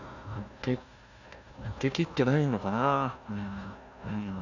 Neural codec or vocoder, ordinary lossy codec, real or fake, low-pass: codec, 16 kHz, 1 kbps, FunCodec, trained on Chinese and English, 50 frames a second; none; fake; 7.2 kHz